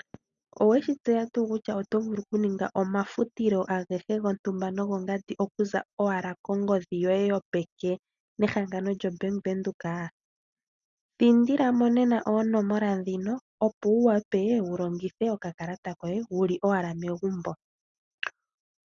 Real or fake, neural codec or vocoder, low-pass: real; none; 7.2 kHz